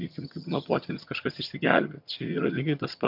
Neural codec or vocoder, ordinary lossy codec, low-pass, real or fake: vocoder, 22.05 kHz, 80 mel bands, HiFi-GAN; MP3, 48 kbps; 5.4 kHz; fake